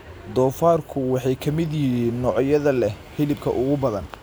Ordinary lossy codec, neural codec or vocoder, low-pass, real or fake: none; none; none; real